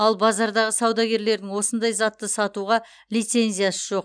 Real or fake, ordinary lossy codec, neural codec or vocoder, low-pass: real; none; none; 9.9 kHz